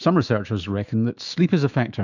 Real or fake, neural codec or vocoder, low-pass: fake; autoencoder, 48 kHz, 128 numbers a frame, DAC-VAE, trained on Japanese speech; 7.2 kHz